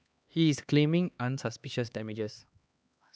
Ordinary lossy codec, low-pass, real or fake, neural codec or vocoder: none; none; fake; codec, 16 kHz, 2 kbps, X-Codec, HuBERT features, trained on LibriSpeech